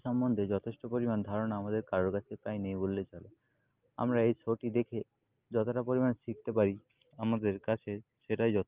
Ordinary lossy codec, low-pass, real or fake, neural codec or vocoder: Opus, 24 kbps; 3.6 kHz; real; none